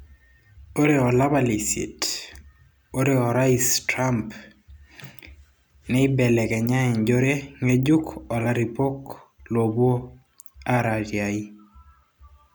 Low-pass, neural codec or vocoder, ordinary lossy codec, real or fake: none; none; none; real